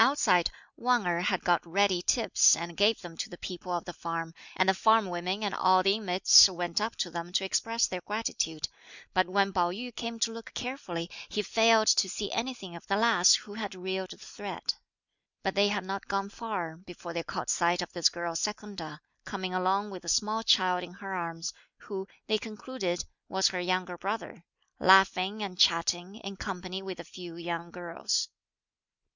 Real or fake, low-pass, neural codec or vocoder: real; 7.2 kHz; none